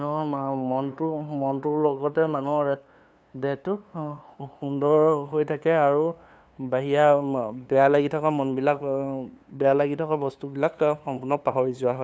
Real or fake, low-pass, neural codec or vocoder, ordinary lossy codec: fake; none; codec, 16 kHz, 2 kbps, FunCodec, trained on LibriTTS, 25 frames a second; none